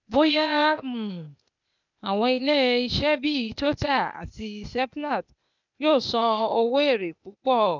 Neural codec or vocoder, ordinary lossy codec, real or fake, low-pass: codec, 16 kHz, 0.8 kbps, ZipCodec; none; fake; 7.2 kHz